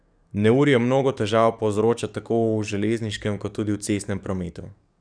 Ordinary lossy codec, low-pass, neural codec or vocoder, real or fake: none; 9.9 kHz; codec, 44.1 kHz, 7.8 kbps, DAC; fake